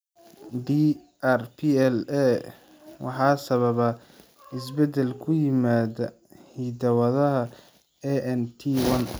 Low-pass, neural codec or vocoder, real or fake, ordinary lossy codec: none; none; real; none